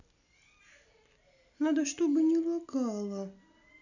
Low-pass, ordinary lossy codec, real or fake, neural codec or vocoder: 7.2 kHz; none; real; none